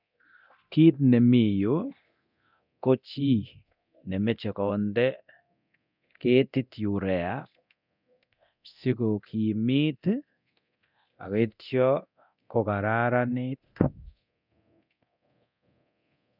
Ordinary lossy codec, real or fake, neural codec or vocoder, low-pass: none; fake; codec, 24 kHz, 0.9 kbps, DualCodec; 5.4 kHz